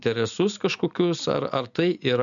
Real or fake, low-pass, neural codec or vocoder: real; 7.2 kHz; none